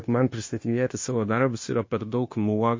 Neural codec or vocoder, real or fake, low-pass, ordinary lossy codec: codec, 16 kHz, 0.9 kbps, LongCat-Audio-Codec; fake; 7.2 kHz; MP3, 32 kbps